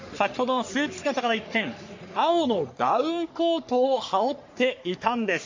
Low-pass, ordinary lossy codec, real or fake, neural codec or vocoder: 7.2 kHz; MP3, 48 kbps; fake; codec, 44.1 kHz, 3.4 kbps, Pupu-Codec